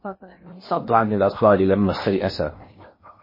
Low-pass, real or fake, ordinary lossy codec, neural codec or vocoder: 5.4 kHz; fake; MP3, 24 kbps; codec, 16 kHz in and 24 kHz out, 0.8 kbps, FocalCodec, streaming, 65536 codes